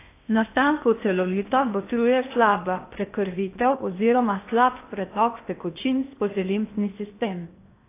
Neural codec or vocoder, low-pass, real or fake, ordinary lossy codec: codec, 16 kHz in and 24 kHz out, 0.8 kbps, FocalCodec, streaming, 65536 codes; 3.6 kHz; fake; AAC, 24 kbps